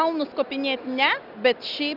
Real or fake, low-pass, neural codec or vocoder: real; 5.4 kHz; none